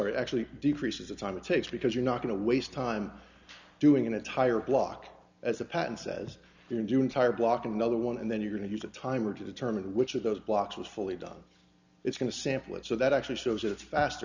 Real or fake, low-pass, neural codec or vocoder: real; 7.2 kHz; none